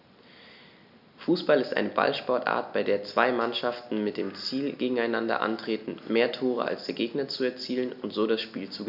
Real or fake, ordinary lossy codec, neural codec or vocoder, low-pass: real; none; none; 5.4 kHz